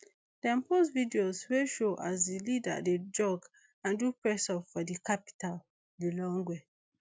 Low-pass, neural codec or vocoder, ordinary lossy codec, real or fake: none; none; none; real